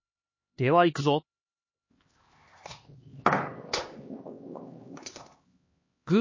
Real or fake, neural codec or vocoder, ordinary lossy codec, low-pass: fake; codec, 16 kHz, 2 kbps, X-Codec, HuBERT features, trained on LibriSpeech; MP3, 32 kbps; 7.2 kHz